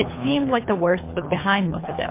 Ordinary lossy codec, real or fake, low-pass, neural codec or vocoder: MP3, 24 kbps; fake; 3.6 kHz; codec, 24 kHz, 3 kbps, HILCodec